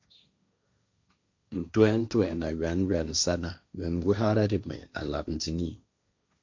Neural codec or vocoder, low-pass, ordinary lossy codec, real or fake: codec, 16 kHz, 1.1 kbps, Voila-Tokenizer; 7.2 kHz; MP3, 64 kbps; fake